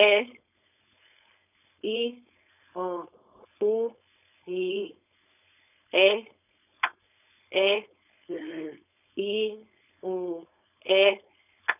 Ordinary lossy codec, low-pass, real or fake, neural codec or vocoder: none; 3.6 kHz; fake; codec, 16 kHz, 4.8 kbps, FACodec